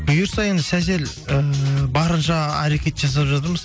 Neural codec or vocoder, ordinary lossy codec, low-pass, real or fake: none; none; none; real